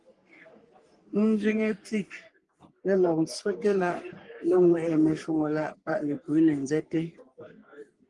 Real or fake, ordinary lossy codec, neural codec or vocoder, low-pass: fake; Opus, 24 kbps; codec, 44.1 kHz, 3.4 kbps, Pupu-Codec; 10.8 kHz